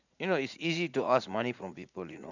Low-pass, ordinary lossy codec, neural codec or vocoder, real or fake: 7.2 kHz; none; vocoder, 44.1 kHz, 80 mel bands, Vocos; fake